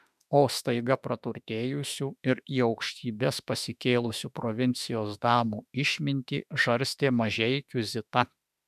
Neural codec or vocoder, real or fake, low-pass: autoencoder, 48 kHz, 32 numbers a frame, DAC-VAE, trained on Japanese speech; fake; 14.4 kHz